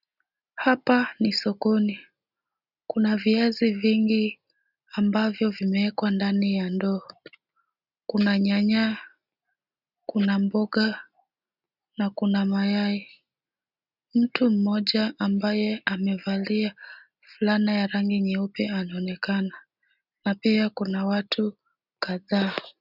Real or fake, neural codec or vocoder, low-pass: real; none; 5.4 kHz